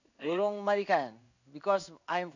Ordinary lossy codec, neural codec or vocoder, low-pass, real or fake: none; codec, 16 kHz in and 24 kHz out, 1 kbps, XY-Tokenizer; 7.2 kHz; fake